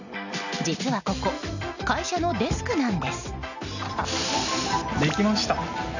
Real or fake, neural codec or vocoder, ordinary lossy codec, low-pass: real; none; none; 7.2 kHz